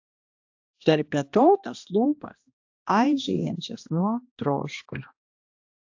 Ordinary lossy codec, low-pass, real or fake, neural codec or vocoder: AAC, 48 kbps; 7.2 kHz; fake; codec, 16 kHz, 1 kbps, X-Codec, HuBERT features, trained on balanced general audio